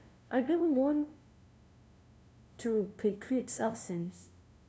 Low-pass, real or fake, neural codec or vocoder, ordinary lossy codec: none; fake; codec, 16 kHz, 0.5 kbps, FunCodec, trained on LibriTTS, 25 frames a second; none